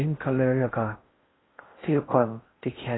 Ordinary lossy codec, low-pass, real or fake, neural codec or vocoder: AAC, 16 kbps; 7.2 kHz; fake; codec, 16 kHz in and 24 kHz out, 0.6 kbps, FocalCodec, streaming, 4096 codes